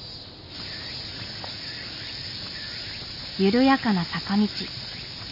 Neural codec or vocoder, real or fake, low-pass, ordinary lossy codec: none; real; 5.4 kHz; none